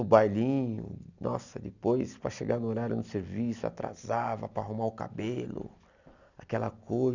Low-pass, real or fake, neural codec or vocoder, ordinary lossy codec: 7.2 kHz; real; none; none